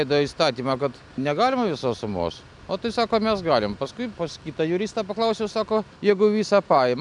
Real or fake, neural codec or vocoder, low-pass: real; none; 10.8 kHz